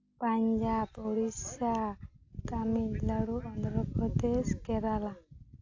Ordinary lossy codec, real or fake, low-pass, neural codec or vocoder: AAC, 48 kbps; real; 7.2 kHz; none